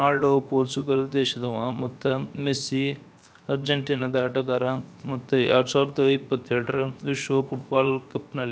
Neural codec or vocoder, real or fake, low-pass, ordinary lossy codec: codec, 16 kHz, 0.7 kbps, FocalCodec; fake; none; none